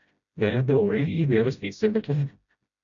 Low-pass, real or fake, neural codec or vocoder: 7.2 kHz; fake; codec, 16 kHz, 0.5 kbps, FreqCodec, smaller model